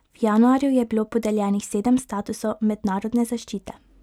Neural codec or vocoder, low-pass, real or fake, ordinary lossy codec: none; 19.8 kHz; real; none